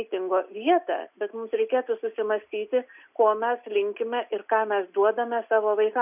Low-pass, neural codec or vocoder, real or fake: 3.6 kHz; none; real